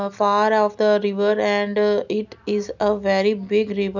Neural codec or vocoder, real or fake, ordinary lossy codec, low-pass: none; real; none; 7.2 kHz